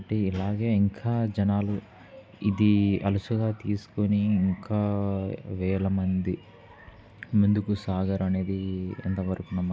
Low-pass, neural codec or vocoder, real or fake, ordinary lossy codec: none; none; real; none